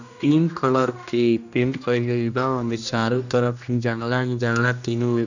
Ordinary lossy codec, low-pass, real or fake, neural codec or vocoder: none; 7.2 kHz; fake; codec, 16 kHz, 1 kbps, X-Codec, HuBERT features, trained on general audio